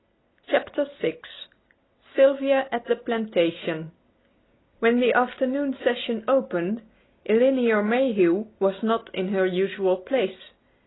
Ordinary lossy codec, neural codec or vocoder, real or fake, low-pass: AAC, 16 kbps; none; real; 7.2 kHz